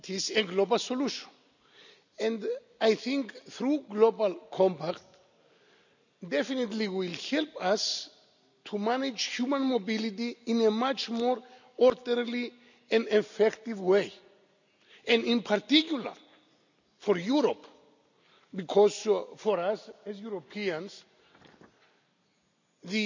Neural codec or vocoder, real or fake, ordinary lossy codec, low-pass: none; real; none; 7.2 kHz